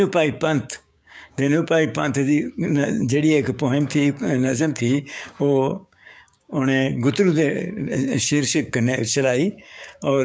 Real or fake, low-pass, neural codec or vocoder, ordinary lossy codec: fake; none; codec, 16 kHz, 6 kbps, DAC; none